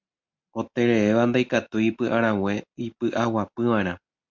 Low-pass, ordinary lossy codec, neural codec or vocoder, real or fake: 7.2 kHz; MP3, 64 kbps; none; real